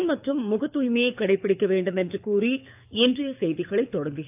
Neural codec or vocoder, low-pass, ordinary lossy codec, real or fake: codec, 24 kHz, 6 kbps, HILCodec; 3.6 kHz; AAC, 32 kbps; fake